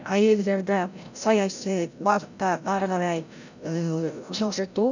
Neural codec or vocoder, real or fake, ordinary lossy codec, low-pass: codec, 16 kHz, 0.5 kbps, FreqCodec, larger model; fake; none; 7.2 kHz